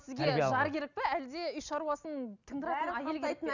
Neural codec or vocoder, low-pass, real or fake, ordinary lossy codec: none; 7.2 kHz; real; none